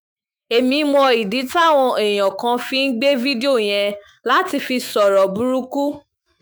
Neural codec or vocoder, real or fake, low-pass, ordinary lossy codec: autoencoder, 48 kHz, 128 numbers a frame, DAC-VAE, trained on Japanese speech; fake; none; none